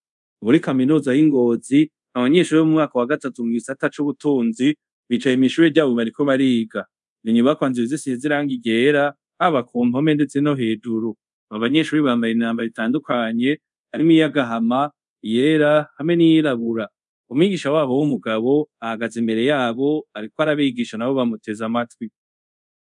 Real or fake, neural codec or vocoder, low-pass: fake; codec, 24 kHz, 0.5 kbps, DualCodec; 10.8 kHz